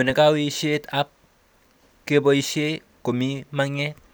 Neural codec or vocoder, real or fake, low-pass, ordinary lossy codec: none; real; none; none